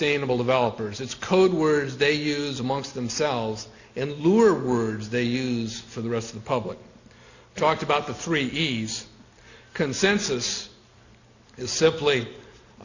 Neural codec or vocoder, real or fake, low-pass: none; real; 7.2 kHz